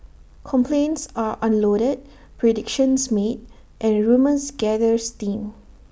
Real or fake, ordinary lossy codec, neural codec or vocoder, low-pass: real; none; none; none